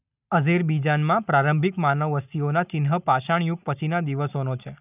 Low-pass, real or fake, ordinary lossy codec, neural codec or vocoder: 3.6 kHz; real; none; none